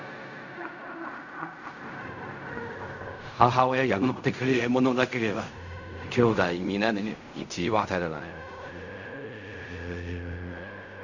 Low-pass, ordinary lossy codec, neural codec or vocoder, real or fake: 7.2 kHz; none; codec, 16 kHz in and 24 kHz out, 0.4 kbps, LongCat-Audio-Codec, fine tuned four codebook decoder; fake